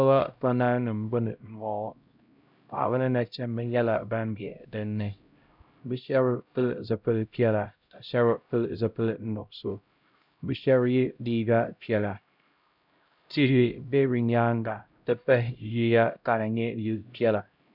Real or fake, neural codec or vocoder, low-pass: fake; codec, 16 kHz, 0.5 kbps, X-Codec, HuBERT features, trained on LibriSpeech; 5.4 kHz